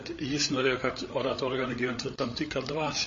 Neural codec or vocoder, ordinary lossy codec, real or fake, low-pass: codec, 16 kHz, 8 kbps, FreqCodec, larger model; MP3, 32 kbps; fake; 7.2 kHz